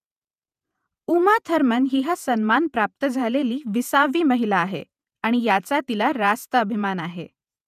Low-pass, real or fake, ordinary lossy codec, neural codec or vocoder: 14.4 kHz; fake; none; vocoder, 48 kHz, 128 mel bands, Vocos